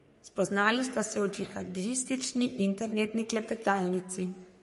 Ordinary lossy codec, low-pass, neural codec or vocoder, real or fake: MP3, 48 kbps; 14.4 kHz; codec, 44.1 kHz, 3.4 kbps, Pupu-Codec; fake